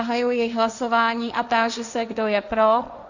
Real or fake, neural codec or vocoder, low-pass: fake; codec, 16 kHz, 1.1 kbps, Voila-Tokenizer; 7.2 kHz